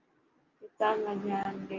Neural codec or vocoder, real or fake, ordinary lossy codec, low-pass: none; real; Opus, 32 kbps; 7.2 kHz